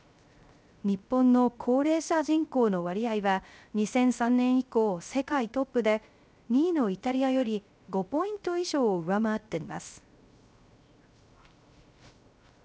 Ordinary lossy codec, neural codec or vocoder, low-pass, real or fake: none; codec, 16 kHz, 0.3 kbps, FocalCodec; none; fake